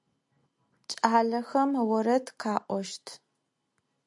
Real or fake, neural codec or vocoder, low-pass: real; none; 10.8 kHz